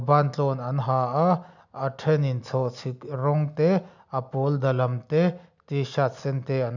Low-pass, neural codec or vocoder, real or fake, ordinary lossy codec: 7.2 kHz; none; real; none